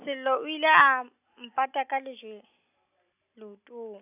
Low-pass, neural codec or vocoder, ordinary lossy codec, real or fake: 3.6 kHz; none; AAC, 32 kbps; real